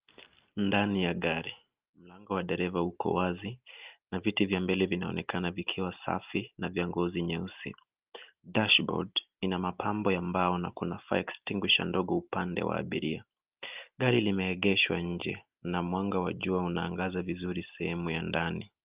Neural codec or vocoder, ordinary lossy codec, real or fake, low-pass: none; Opus, 24 kbps; real; 3.6 kHz